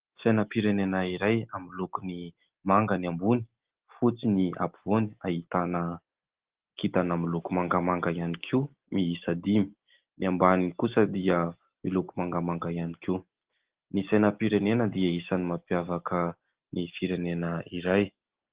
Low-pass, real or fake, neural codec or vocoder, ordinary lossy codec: 3.6 kHz; real; none; Opus, 16 kbps